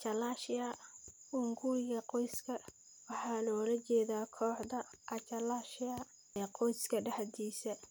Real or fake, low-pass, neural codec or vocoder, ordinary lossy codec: real; none; none; none